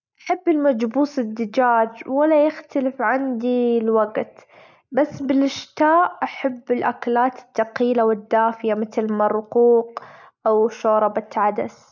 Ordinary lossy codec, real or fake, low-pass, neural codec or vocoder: none; real; 7.2 kHz; none